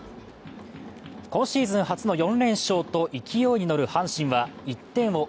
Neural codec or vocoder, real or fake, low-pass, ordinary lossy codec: none; real; none; none